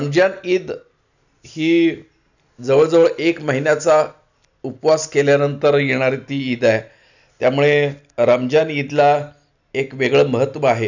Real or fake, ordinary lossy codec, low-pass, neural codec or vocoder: real; none; 7.2 kHz; none